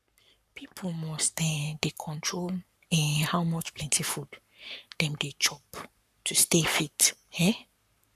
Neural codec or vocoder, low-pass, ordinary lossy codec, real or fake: codec, 44.1 kHz, 7.8 kbps, Pupu-Codec; 14.4 kHz; none; fake